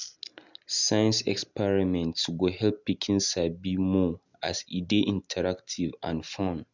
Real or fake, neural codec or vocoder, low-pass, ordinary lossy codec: real; none; 7.2 kHz; none